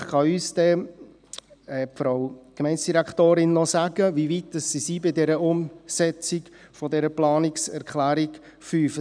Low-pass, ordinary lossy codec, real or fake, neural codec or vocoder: 9.9 kHz; none; real; none